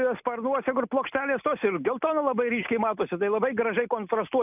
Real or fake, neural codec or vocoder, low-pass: real; none; 3.6 kHz